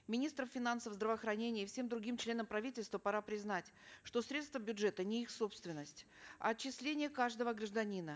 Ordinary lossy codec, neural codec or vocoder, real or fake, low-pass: none; none; real; none